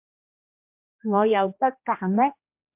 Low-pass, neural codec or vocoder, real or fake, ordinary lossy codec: 3.6 kHz; codec, 16 kHz, 1 kbps, X-Codec, HuBERT features, trained on general audio; fake; MP3, 32 kbps